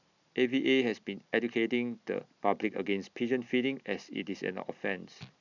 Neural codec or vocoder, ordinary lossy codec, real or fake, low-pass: none; none; real; 7.2 kHz